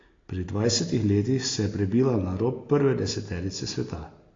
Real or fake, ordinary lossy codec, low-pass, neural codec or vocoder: real; AAC, 32 kbps; 7.2 kHz; none